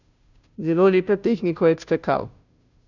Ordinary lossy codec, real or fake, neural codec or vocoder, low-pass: none; fake; codec, 16 kHz, 0.5 kbps, FunCodec, trained on Chinese and English, 25 frames a second; 7.2 kHz